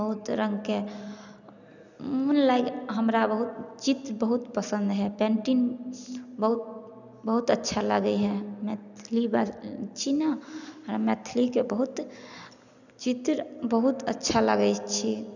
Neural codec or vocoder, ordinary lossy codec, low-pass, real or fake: none; none; 7.2 kHz; real